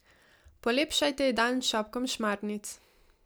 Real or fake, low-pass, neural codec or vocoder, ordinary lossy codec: real; none; none; none